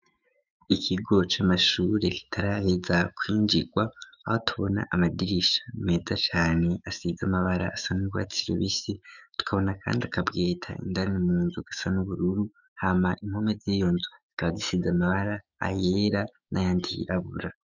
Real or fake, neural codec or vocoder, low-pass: fake; autoencoder, 48 kHz, 128 numbers a frame, DAC-VAE, trained on Japanese speech; 7.2 kHz